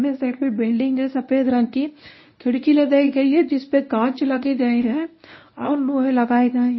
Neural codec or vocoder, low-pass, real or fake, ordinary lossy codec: codec, 24 kHz, 0.9 kbps, WavTokenizer, medium speech release version 1; 7.2 kHz; fake; MP3, 24 kbps